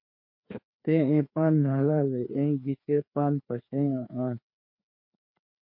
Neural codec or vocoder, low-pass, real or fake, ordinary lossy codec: codec, 16 kHz, 2 kbps, FreqCodec, larger model; 5.4 kHz; fake; MP3, 32 kbps